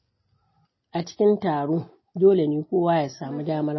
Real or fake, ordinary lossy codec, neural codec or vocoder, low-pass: real; MP3, 24 kbps; none; 7.2 kHz